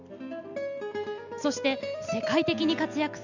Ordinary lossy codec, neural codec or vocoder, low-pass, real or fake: none; none; 7.2 kHz; real